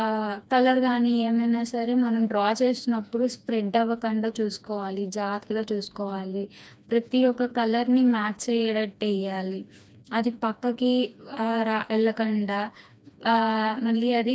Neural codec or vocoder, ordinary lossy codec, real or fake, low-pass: codec, 16 kHz, 2 kbps, FreqCodec, smaller model; none; fake; none